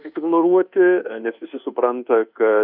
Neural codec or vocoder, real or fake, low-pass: codec, 24 kHz, 1.2 kbps, DualCodec; fake; 5.4 kHz